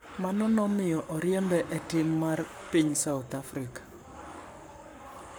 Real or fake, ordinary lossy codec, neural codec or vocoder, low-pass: fake; none; codec, 44.1 kHz, 7.8 kbps, Pupu-Codec; none